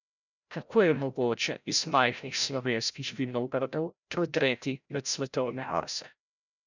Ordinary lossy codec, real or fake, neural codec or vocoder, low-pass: none; fake; codec, 16 kHz, 0.5 kbps, FreqCodec, larger model; 7.2 kHz